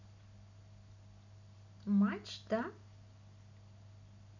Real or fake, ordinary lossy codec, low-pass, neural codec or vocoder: real; none; 7.2 kHz; none